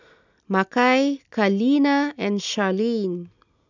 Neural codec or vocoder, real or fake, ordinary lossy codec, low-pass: none; real; none; 7.2 kHz